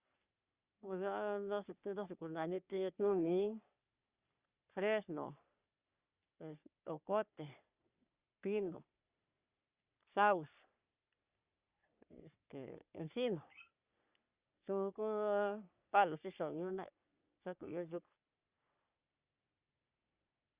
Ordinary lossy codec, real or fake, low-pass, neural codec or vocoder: Opus, 64 kbps; fake; 3.6 kHz; codec, 44.1 kHz, 3.4 kbps, Pupu-Codec